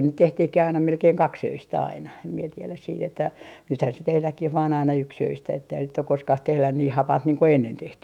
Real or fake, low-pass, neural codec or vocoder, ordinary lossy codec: fake; 19.8 kHz; autoencoder, 48 kHz, 128 numbers a frame, DAC-VAE, trained on Japanese speech; none